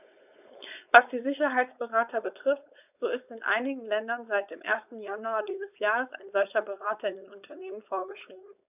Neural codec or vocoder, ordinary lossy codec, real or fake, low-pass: codec, 16 kHz, 4.8 kbps, FACodec; none; fake; 3.6 kHz